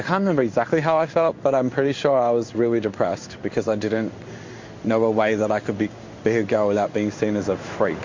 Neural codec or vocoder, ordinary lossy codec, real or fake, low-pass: codec, 16 kHz in and 24 kHz out, 1 kbps, XY-Tokenizer; MP3, 48 kbps; fake; 7.2 kHz